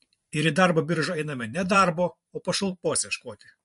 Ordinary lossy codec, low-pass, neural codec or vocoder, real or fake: MP3, 48 kbps; 14.4 kHz; vocoder, 44.1 kHz, 128 mel bands every 512 samples, BigVGAN v2; fake